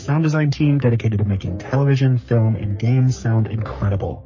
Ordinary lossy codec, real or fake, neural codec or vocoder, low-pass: MP3, 32 kbps; fake; codec, 44.1 kHz, 3.4 kbps, Pupu-Codec; 7.2 kHz